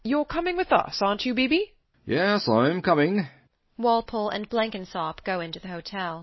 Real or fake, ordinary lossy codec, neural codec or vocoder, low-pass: real; MP3, 24 kbps; none; 7.2 kHz